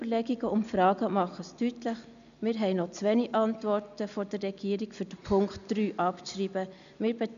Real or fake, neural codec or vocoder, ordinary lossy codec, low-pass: real; none; none; 7.2 kHz